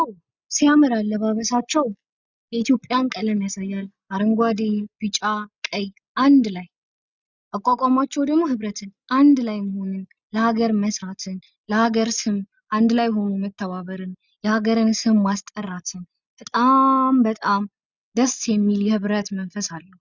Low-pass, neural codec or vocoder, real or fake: 7.2 kHz; none; real